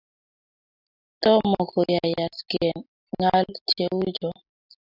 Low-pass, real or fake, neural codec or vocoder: 5.4 kHz; real; none